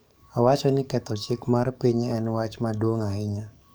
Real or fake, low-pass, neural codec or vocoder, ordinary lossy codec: fake; none; codec, 44.1 kHz, 7.8 kbps, DAC; none